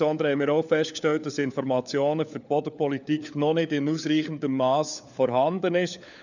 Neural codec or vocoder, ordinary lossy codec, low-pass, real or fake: codec, 16 kHz, 4 kbps, FunCodec, trained on LibriTTS, 50 frames a second; none; 7.2 kHz; fake